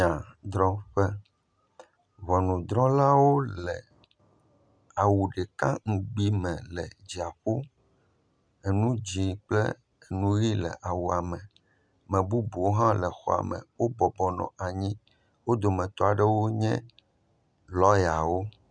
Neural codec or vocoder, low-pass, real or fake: none; 9.9 kHz; real